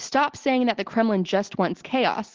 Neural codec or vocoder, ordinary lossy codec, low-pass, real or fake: none; Opus, 16 kbps; 7.2 kHz; real